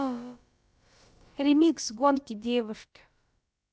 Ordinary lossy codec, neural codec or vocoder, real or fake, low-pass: none; codec, 16 kHz, about 1 kbps, DyCAST, with the encoder's durations; fake; none